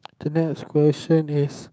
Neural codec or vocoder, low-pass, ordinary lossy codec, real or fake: codec, 16 kHz, 6 kbps, DAC; none; none; fake